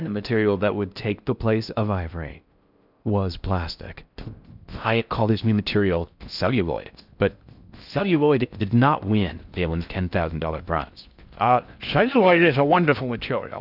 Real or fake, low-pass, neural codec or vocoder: fake; 5.4 kHz; codec, 16 kHz in and 24 kHz out, 0.6 kbps, FocalCodec, streaming, 4096 codes